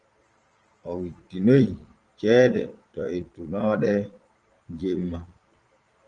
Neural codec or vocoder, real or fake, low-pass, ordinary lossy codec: vocoder, 22.05 kHz, 80 mel bands, Vocos; fake; 9.9 kHz; Opus, 24 kbps